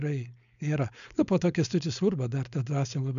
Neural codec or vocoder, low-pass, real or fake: codec, 16 kHz, 4.8 kbps, FACodec; 7.2 kHz; fake